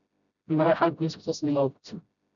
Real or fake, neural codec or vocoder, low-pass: fake; codec, 16 kHz, 0.5 kbps, FreqCodec, smaller model; 7.2 kHz